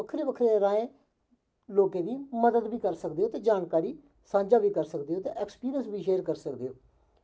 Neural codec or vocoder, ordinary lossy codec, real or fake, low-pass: none; none; real; none